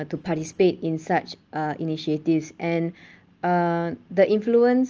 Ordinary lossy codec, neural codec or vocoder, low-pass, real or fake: Opus, 24 kbps; none; 7.2 kHz; real